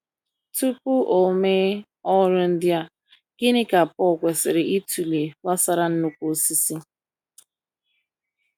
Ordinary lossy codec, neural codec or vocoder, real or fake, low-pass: none; none; real; none